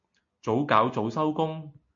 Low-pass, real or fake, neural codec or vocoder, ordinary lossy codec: 7.2 kHz; real; none; MP3, 48 kbps